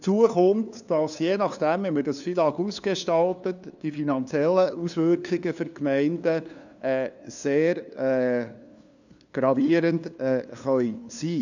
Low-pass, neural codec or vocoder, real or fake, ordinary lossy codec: 7.2 kHz; codec, 16 kHz, 2 kbps, FunCodec, trained on LibriTTS, 25 frames a second; fake; none